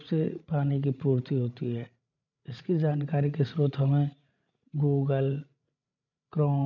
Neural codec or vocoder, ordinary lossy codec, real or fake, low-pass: codec, 16 kHz, 16 kbps, FreqCodec, larger model; none; fake; 7.2 kHz